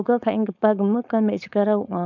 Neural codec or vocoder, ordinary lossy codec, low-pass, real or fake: codec, 16 kHz, 4.8 kbps, FACodec; none; 7.2 kHz; fake